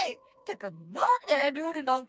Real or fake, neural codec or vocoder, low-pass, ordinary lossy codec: fake; codec, 16 kHz, 2 kbps, FreqCodec, smaller model; none; none